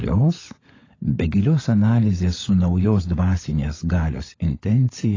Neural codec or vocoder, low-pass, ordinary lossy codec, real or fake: codec, 16 kHz, 16 kbps, FunCodec, trained on LibriTTS, 50 frames a second; 7.2 kHz; AAC, 32 kbps; fake